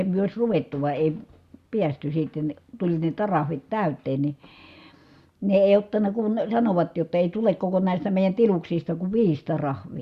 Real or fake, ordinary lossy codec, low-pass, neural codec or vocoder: real; Opus, 64 kbps; 14.4 kHz; none